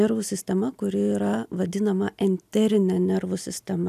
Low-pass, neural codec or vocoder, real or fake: 14.4 kHz; none; real